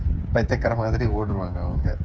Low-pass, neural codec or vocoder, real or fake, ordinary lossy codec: none; codec, 16 kHz, 8 kbps, FreqCodec, smaller model; fake; none